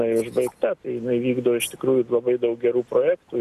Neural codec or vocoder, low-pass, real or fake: none; 14.4 kHz; real